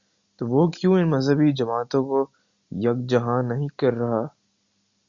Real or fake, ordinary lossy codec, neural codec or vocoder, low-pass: real; Opus, 64 kbps; none; 7.2 kHz